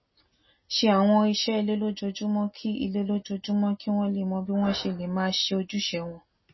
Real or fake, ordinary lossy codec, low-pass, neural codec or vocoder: real; MP3, 24 kbps; 7.2 kHz; none